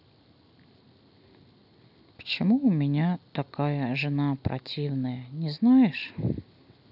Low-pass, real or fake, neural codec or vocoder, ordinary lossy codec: 5.4 kHz; real; none; none